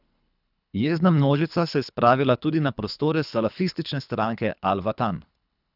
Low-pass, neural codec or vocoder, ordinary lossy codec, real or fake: 5.4 kHz; codec, 24 kHz, 3 kbps, HILCodec; none; fake